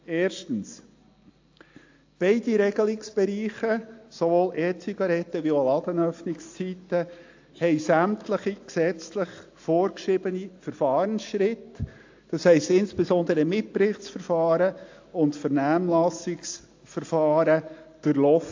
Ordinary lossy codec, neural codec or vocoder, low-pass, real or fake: AAC, 48 kbps; none; 7.2 kHz; real